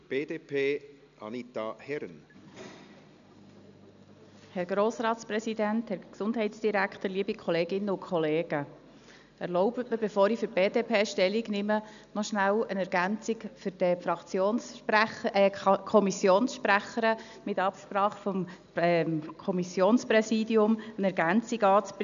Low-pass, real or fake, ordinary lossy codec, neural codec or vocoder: 7.2 kHz; real; none; none